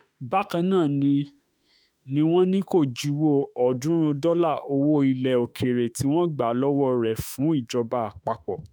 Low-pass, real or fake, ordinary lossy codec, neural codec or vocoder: none; fake; none; autoencoder, 48 kHz, 32 numbers a frame, DAC-VAE, trained on Japanese speech